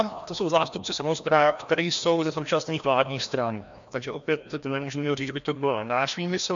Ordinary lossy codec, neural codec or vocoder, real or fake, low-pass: MP3, 64 kbps; codec, 16 kHz, 1 kbps, FreqCodec, larger model; fake; 7.2 kHz